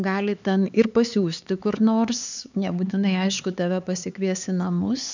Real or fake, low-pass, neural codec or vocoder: fake; 7.2 kHz; codec, 16 kHz, 4 kbps, X-Codec, HuBERT features, trained on LibriSpeech